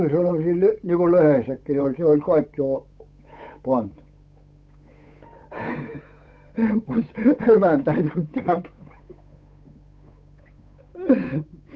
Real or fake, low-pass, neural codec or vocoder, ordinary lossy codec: fake; none; codec, 16 kHz, 8 kbps, FunCodec, trained on Chinese and English, 25 frames a second; none